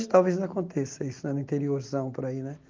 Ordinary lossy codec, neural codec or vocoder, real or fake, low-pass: Opus, 32 kbps; none; real; 7.2 kHz